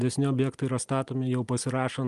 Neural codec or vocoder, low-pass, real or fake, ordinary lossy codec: none; 10.8 kHz; real; Opus, 32 kbps